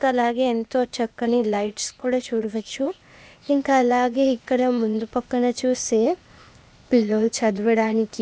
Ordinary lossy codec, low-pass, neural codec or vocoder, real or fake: none; none; codec, 16 kHz, 0.8 kbps, ZipCodec; fake